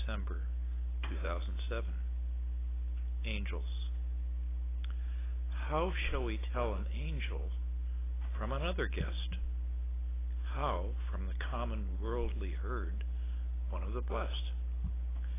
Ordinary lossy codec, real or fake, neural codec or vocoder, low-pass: AAC, 16 kbps; real; none; 3.6 kHz